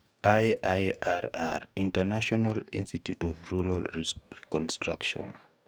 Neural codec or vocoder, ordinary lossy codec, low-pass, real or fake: codec, 44.1 kHz, 2.6 kbps, DAC; none; none; fake